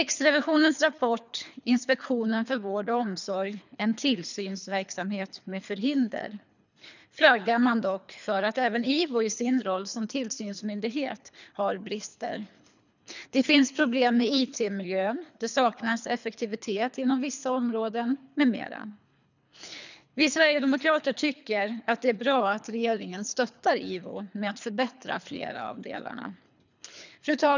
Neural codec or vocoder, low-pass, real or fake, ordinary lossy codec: codec, 24 kHz, 3 kbps, HILCodec; 7.2 kHz; fake; none